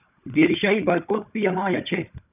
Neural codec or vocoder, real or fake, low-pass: codec, 16 kHz, 16 kbps, FunCodec, trained on Chinese and English, 50 frames a second; fake; 3.6 kHz